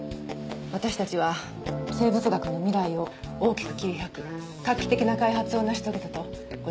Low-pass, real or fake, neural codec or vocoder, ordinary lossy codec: none; real; none; none